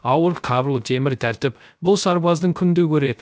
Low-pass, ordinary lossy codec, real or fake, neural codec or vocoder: none; none; fake; codec, 16 kHz, 0.3 kbps, FocalCodec